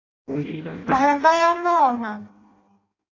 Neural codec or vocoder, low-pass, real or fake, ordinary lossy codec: codec, 16 kHz in and 24 kHz out, 0.6 kbps, FireRedTTS-2 codec; 7.2 kHz; fake; AAC, 32 kbps